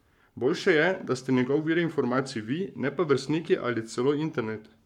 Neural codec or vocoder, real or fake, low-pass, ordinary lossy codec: codec, 44.1 kHz, 7.8 kbps, Pupu-Codec; fake; 19.8 kHz; MP3, 96 kbps